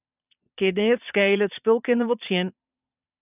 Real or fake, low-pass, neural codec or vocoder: fake; 3.6 kHz; vocoder, 22.05 kHz, 80 mel bands, Vocos